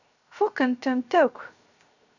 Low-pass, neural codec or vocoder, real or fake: 7.2 kHz; codec, 16 kHz, 0.7 kbps, FocalCodec; fake